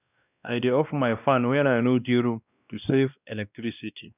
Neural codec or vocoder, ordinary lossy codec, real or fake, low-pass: codec, 16 kHz, 1 kbps, X-Codec, WavLM features, trained on Multilingual LibriSpeech; none; fake; 3.6 kHz